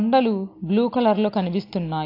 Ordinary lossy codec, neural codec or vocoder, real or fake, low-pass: AAC, 32 kbps; none; real; 5.4 kHz